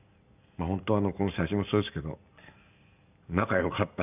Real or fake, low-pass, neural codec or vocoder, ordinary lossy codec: real; 3.6 kHz; none; none